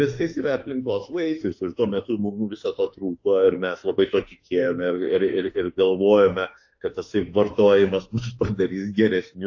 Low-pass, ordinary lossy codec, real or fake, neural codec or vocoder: 7.2 kHz; AAC, 48 kbps; fake; autoencoder, 48 kHz, 32 numbers a frame, DAC-VAE, trained on Japanese speech